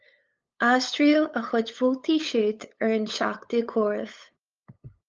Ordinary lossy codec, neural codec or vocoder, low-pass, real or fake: Opus, 24 kbps; codec, 16 kHz, 8 kbps, FunCodec, trained on LibriTTS, 25 frames a second; 7.2 kHz; fake